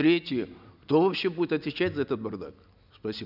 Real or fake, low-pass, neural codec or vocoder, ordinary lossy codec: real; 5.4 kHz; none; none